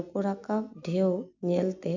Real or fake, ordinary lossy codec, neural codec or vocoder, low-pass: real; none; none; 7.2 kHz